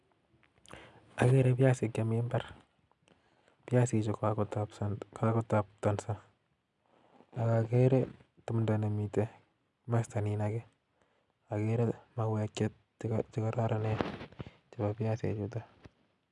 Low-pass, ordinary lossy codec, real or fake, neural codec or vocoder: 10.8 kHz; none; real; none